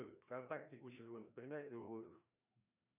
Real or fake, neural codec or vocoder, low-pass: fake; codec, 16 kHz, 1 kbps, FreqCodec, larger model; 3.6 kHz